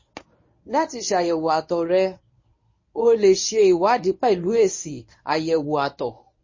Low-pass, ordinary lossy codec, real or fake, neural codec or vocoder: 7.2 kHz; MP3, 32 kbps; fake; codec, 24 kHz, 0.9 kbps, WavTokenizer, medium speech release version 1